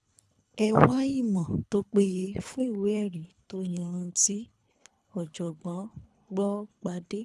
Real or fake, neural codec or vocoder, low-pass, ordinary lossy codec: fake; codec, 24 kHz, 3 kbps, HILCodec; none; none